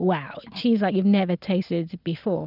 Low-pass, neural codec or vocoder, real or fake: 5.4 kHz; none; real